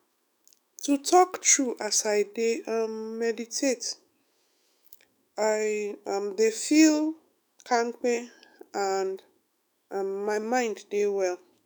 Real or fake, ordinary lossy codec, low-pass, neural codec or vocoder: fake; none; none; autoencoder, 48 kHz, 128 numbers a frame, DAC-VAE, trained on Japanese speech